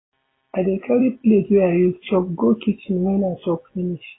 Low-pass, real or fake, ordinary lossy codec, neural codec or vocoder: 7.2 kHz; real; AAC, 16 kbps; none